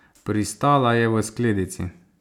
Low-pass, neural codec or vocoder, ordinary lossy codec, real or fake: 19.8 kHz; none; none; real